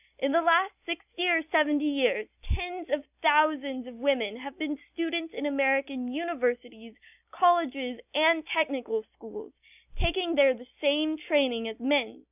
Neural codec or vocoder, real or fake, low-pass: none; real; 3.6 kHz